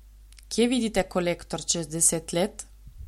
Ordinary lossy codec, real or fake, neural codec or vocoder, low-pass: MP3, 64 kbps; real; none; 19.8 kHz